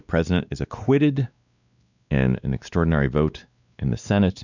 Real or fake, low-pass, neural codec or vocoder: fake; 7.2 kHz; codec, 16 kHz, 2 kbps, X-Codec, WavLM features, trained on Multilingual LibriSpeech